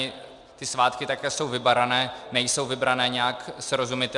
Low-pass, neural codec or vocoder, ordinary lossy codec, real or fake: 10.8 kHz; none; Opus, 64 kbps; real